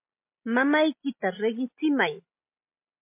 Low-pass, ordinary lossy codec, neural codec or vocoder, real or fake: 3.6 kHz; MP3, 24 kbps; none; real